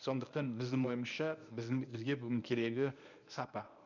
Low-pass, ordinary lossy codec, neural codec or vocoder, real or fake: 7.2 kHz; none; codec, 24 kHz, 0.9 kbps, WavTokenizer, medium speech release version 1; fake